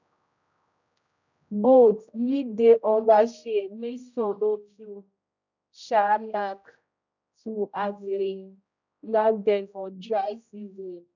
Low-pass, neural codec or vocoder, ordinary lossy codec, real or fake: 7.2 kHz; codec, 16 kHz, 0.5 kbps, X-Codec, HuBERT features, trained on general audio; none; fake